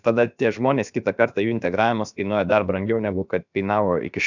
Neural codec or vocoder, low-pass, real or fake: codec, 16 kHz, about 1 kbps, DyCAST, with the encoder's durations; 7.2 kHz; fake